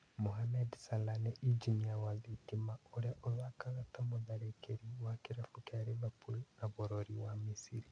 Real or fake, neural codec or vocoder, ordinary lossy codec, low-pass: real; none; MP3, 64 kbps; 9.9 kHz